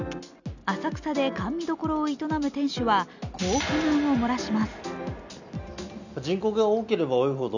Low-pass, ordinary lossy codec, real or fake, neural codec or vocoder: 7.2 kHz; none; real; none